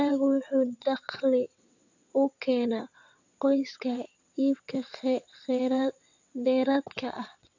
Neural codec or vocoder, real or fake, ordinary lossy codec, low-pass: vocoder, 22.05 kHz, 80 mel bands, WaveNeXt; fake; AAC, 48 kbps; 7.2 kHz